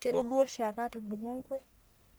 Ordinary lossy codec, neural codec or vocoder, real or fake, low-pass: none; codec, 44.1 kHz, 1.7 kbps, Pupu-Codec; fake; none